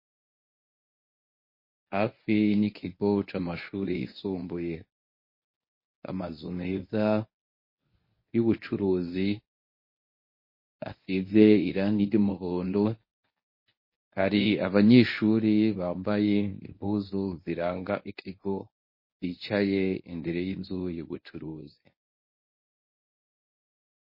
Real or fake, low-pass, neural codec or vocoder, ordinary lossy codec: fake; 5.4 kHz; codec, 24 kHz, 0.9 kbps, WavTokenizer, medium speech release version 1; MP3, 24 kbps